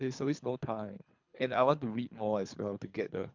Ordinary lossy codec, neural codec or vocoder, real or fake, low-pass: MP3, 64 kbps; codec, 24 kHz, 3 kbps, HILCodec; fake; 7.2 kHz